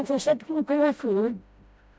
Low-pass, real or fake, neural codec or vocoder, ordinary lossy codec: none; fake; codec, 16 kHz, 0.5 kbps, FreqCodec, smaller model; none